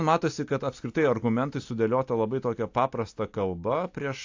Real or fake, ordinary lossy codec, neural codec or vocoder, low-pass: real; AAC, 48 kbps; none; 7.2 kHz